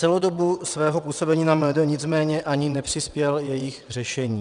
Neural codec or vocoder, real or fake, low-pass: vocoder, 22.05 kHz, 80 mel bands, WaveNeXt; fake; 9.9 kHz